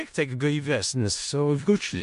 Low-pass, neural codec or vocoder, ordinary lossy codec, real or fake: 10.8 kHz; codec, 16 kHz in and 24 kHz out, 0.4 kbps, LongCat-Audio-Codec, four codebook decoder; MP3, 64 kbps; fake